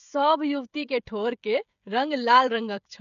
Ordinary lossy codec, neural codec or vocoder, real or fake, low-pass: none; codec, 16 kHz, 16 kbps, FreqCodec, smaller model; fake; 7.2 kHz